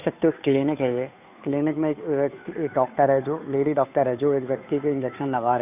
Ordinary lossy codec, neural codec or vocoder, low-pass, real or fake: none; codec, 16 kHz, 2 kbps, FunCodec, trained on Chinese and English, 25 frames a second; 3.6 kHz; fake